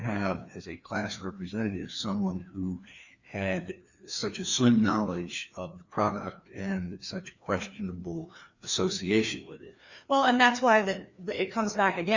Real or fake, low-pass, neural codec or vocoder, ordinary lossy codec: fake; 7.2 kHz; codec, 16 kHz, 2 kbps, FreqCodec, larger model; Opus, 64 kbps